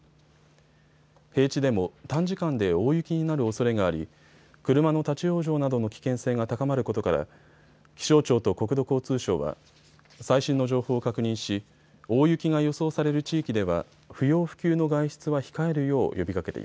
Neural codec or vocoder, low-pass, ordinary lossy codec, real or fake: none; none; none; real